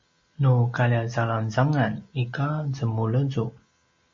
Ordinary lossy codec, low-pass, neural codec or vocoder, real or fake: MP3, 32 kbps; 7.2 kHz; none; real